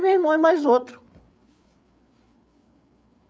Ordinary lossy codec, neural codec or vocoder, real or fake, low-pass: none; codec, 16 kHz, 8 kbps, FreqCodec, larger model; fake; none